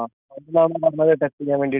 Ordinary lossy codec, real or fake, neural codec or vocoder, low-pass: none; real; none; 3.6 kHz